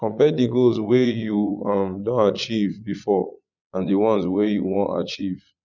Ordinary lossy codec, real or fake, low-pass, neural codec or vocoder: none; fake; 7.2 kHz; vocoder, 22.05 kHz, 80 mel bands, Vocos